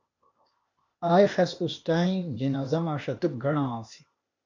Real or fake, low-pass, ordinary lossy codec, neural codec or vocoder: fake; 7.2 kHz; MP3, 64 kbps; codec, 16 kHz, 0.8 kbps, ZipCodec